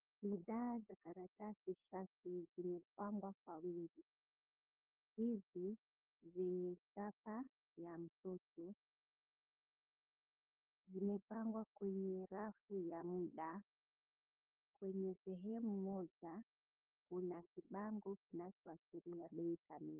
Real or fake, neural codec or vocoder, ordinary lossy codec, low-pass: fake; codec, 16 kHz, 8 kbps, FunCodec, trained on LibriTTS, 25 frames a second; Opus, 32 kbps; 3.6 kHz